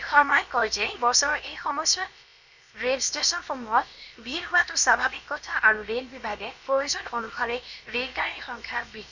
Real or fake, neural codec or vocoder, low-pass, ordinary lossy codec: fake; codec, 16 kHz, about 1 kbps, DyCAST, with the encoder's durations; 7.2 kHz; none